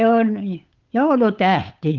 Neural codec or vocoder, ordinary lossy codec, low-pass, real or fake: codec, 16 kHz, 8 kbps, FunCodec, trained on Chinese and English, 25 frames a second; Opus, 32 kbps; 7.2 kHz; fake